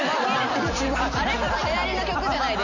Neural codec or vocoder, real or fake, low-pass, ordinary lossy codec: none; real; 7.2 kHz; none